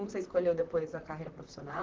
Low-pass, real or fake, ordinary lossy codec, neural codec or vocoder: 7.2 kHz; fake; Opus, 16 kbps; vocoder, 44.1 kHz, 128 mel bands, Pupu-Vocoder